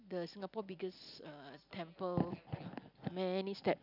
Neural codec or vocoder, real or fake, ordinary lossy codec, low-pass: none; real; none; 5.4 kHz